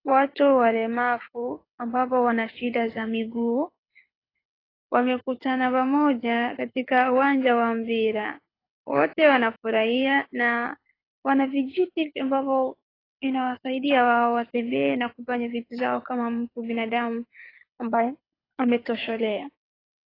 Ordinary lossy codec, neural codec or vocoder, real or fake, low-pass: AAC, 24 kbps; codec, 24 kHz, 6 kbps, HILCodec; fake; 5.4 kHz